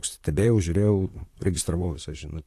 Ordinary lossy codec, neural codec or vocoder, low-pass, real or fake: AAC, 64 kbps; vocoder, 44.1 kHz, 128 mel bands, Pupu-Vocoder; 14.4 kHz; fake